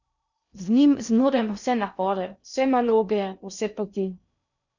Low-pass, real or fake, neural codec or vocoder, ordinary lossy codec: 7.2 kHz; fake; codec, 16 kHz in and 24 kHz out, 0.6 kbps, FocalCodec, streaming, 2048 codes; none